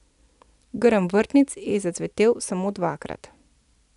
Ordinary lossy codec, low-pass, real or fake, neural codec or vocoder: none; 10.8 kHz; real; none